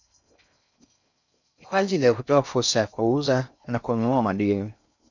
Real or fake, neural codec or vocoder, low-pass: fake; codec, 16 kHz in and 24 kHz out, 0.6 kbps, FocalCodec, streaming, 4096 codes; 7.2 kHz